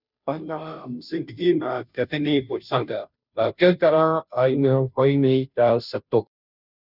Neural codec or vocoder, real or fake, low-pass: codec, 16 kHz, 0.5 kbps, FunCodec, trained on Chinese and English, 25 frames a second; fake; 5.4 kHz